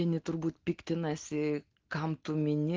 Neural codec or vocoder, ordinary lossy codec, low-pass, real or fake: none; Opus, 16 kbps; 7.2 kHz; real